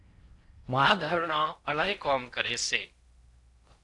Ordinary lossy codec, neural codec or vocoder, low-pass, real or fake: AAC, 48 kbps; codec, 16 kHz in and 24 kHz out, 0.6 kbps, FocalCodec, streaming, 4096 codes; 10.8 kHz; fake